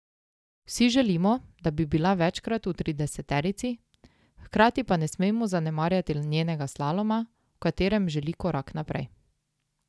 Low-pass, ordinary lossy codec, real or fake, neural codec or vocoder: none; none; real; none